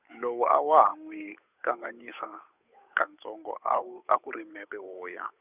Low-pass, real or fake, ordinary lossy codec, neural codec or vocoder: 3.6 kHz; fake; none; codec, 16 kHz, 8 kbps, FunCodec, trained on Chinese and English, 25 frames a second